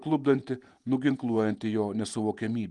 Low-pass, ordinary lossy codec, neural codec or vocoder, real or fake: 10.8 kHz; Opus, 32 kbps; vocoder, 48 kHz, 128 mel bands, Vocos; fake